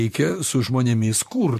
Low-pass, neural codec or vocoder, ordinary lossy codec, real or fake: 14.4 kHz; vocoder, 44.1 kHz, 128 mel bands, Pupu-Vocoder; MP3, 64 kbps; fake